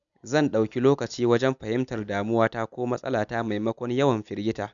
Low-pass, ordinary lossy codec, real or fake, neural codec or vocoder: 7.2 kHz; none; real; none